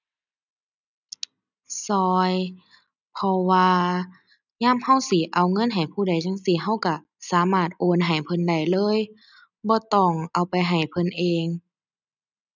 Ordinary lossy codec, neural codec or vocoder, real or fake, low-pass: none; none; real; 7.2 kHz